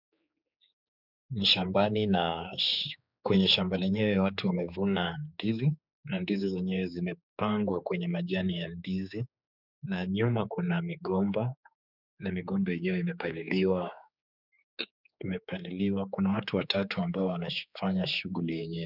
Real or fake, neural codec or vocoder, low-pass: fake; codec, 16 kHz, 4 kbps, X-Codec, HuBERT features, trained on general audio; 5.4 kHz